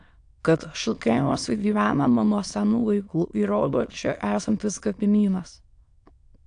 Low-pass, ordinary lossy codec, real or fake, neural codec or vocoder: 9.9 kHz; AAC, 64 kbps; fake; autoencoder, 22.05 kHz, a latent of 192 numbers a frame, VITS, trained on many speakers